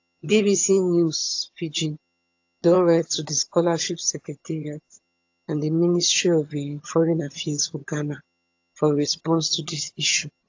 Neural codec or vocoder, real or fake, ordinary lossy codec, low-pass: vocoder, 22.05 kHz, 80 mel bands, HiFi-GAN; fake; AAC, 48 kbps; 7.2 kHz